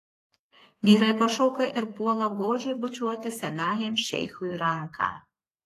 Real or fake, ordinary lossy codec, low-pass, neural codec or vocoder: fake; AAC, 48 kbps; 14.4 kHz; codec, 32 kHz, 1.9 kbps, SNAC